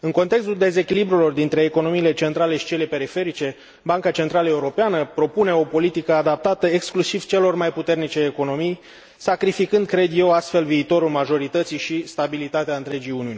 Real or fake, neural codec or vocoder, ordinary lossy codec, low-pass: real; none; none; none